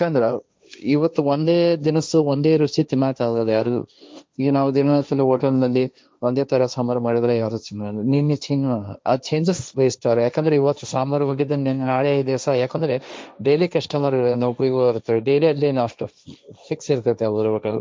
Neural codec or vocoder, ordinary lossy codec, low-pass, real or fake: codec, 16 kHz, 1.1 kbps, Voila-Tokenizer; none; none; fake